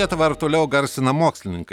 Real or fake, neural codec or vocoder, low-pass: real; none; 19.8 kHz